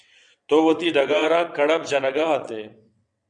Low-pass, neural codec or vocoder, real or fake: 9.9 kHz; vocoder, 22.05 kHz, 80 mel bands, WaveNeXt; fake